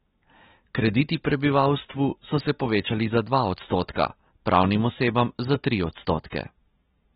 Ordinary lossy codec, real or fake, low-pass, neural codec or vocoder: AAC, 16 kbps; real; 19.8 kHz; none